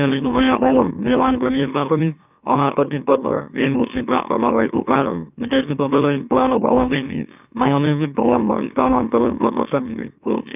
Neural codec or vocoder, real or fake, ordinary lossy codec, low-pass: autoencoder, 44.1 kHz, a latent of 192 numbers a frame, MeloTTS; fake; none; 3.6 kHz